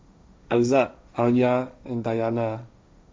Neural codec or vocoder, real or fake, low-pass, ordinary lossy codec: codec, 16 kHz, 1.1 kbps, Voila-Tokenizer; fake; none; none